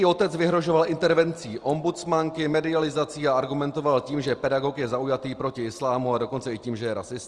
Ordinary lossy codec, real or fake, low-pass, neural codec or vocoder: Opus, 24 kbps; real; 10.8 kHz; none